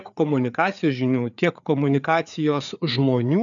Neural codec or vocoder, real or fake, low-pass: codec, 16 kHz, 4 kbps, FreqCodec, larger model; fake; 7.2 kHz